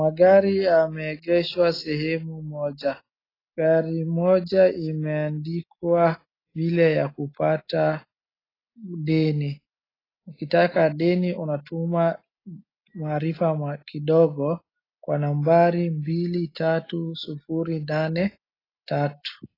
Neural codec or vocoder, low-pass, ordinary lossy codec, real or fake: none; 5.4 kHz; AAC, 24 kbps; real